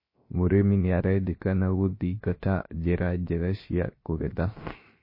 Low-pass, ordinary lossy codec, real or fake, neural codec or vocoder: 5.4 kHz; MP3, 24 kbps; fake; codec, 16 kHz, 0.7 kbps, FocalCodec